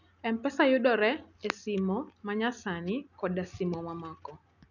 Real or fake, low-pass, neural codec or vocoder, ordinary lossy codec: real; 7.2 kHz; none; none